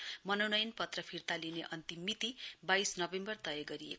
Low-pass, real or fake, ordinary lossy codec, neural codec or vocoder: none; real; none; none